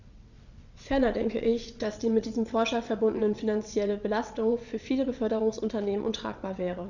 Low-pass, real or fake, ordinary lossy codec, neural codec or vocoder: 7.2 kHz; fake; none; vocoder, 22.05 kHz, 80 mel bands, WaveNeXt